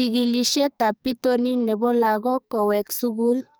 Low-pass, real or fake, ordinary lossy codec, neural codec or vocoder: none; fake; none; codec, 44.1 kHz, 2.6 kbps, SNAC